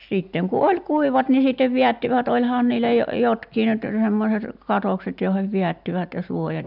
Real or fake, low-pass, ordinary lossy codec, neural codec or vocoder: real; 5.4 kHz; none; none